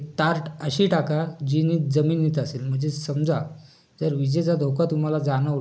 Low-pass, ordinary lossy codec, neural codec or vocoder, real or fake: none; none; none; real